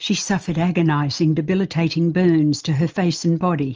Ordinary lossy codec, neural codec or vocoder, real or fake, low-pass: Opus, 24 kbps; none; real; 7.2 kHz